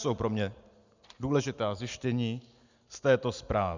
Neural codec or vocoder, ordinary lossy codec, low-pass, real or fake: none; Opus, 64 kbps; 7.2 kHz; real